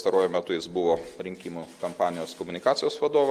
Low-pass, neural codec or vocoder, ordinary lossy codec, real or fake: 14.4 kHz; none; Opus, 32 kbps; real